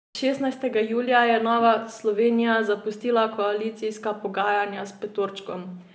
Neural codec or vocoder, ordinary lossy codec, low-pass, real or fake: none; none; none; real